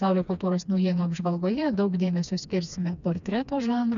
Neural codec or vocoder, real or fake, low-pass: codec, 16 kHz, 2 kbps, FreqCodec, smaller model; fake; 7.2 kHz